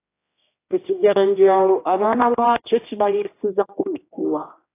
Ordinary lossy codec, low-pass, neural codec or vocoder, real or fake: AAC, 24 kbps; 3.6 kHz; codec, 16 kHz, 1 kbps, X-Codec, HuBERT features, trained on general audio; fake